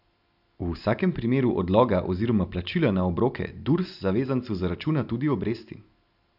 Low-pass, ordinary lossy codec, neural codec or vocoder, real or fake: 5.4 kHz; none; none; real